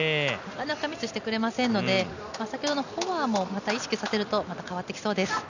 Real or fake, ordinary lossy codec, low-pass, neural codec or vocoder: real; none; 7.2 kHz; none